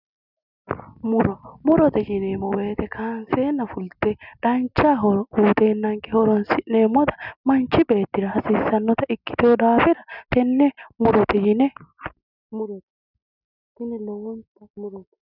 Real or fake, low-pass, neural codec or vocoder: real; 5.4 kHz; none